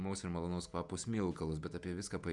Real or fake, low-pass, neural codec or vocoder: fake; 10.8 kHz; vocoder, 48 kHz, 128 mel bands, Vocos